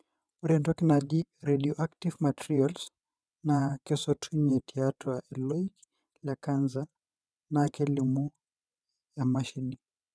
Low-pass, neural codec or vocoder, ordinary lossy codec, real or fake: none; vocoder, 22.05 kHz, 80 mel bands, WaveNeXt; none; fake